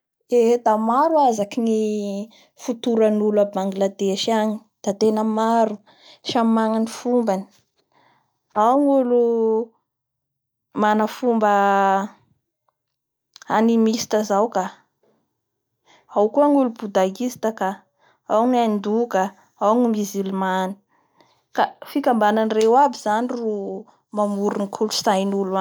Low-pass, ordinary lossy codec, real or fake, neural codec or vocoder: none; none; real; none